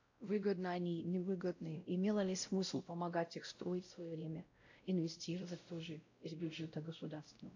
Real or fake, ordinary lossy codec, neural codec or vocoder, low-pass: fake; AAC, 48 kbps; codec, 16 kHz, 0.5 kbps, X-Codec, WavLM features, trained on Multilingual LibriSpeech; 7.2 kHz